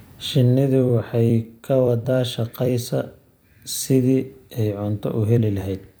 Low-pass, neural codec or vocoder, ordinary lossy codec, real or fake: none; vocoder, 44.1 kHz, 128 mel bands every 256 samples, BigVGAN v2; none; fake